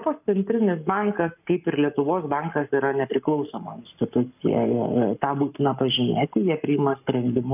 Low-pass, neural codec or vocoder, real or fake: 3.6 kHz; codec, 44.1 kHz, 7.8 kbps, Pupu-Codec; fake